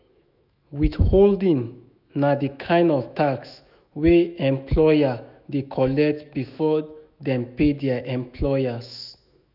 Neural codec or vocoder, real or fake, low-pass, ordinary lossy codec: autoencoder, 48 kHz, 128 numbers a frame, DAC-VAE, trained on Japanese speech; fake; 5.4 kHz; none